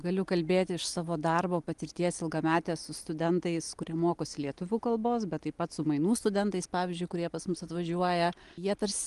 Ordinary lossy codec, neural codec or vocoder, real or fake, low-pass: Opus, 24 kbps; none; real; 10.8 kHz